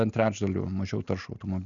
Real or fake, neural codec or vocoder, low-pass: real; none; 7.2 kHz